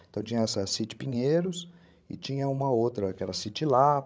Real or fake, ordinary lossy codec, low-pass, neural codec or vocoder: fake; none; none; codec, 16 kHz, 16 kbps, FreqCodec, larger model